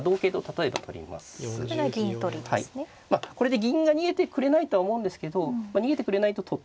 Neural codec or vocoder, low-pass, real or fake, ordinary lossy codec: none; none; real; none